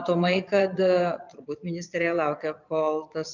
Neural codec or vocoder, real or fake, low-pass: vocoder, 22.05 kHz, 80 mel bands, Vocos; fake; 7.2 kHz